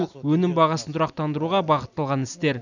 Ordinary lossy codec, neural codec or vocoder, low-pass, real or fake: none; none; 7.2 kHz; real